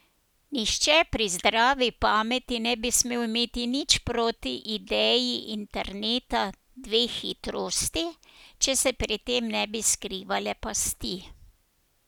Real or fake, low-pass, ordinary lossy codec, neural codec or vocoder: real; none; none; none